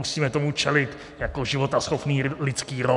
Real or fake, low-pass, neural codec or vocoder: real; 10.8 kHz; none